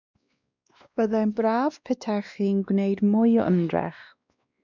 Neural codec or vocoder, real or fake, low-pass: codec, 16 kHz, 2 kbps, X-Codec, WavLM features, trained on Multilingual LibriSpeech; fake; 7.2 kHz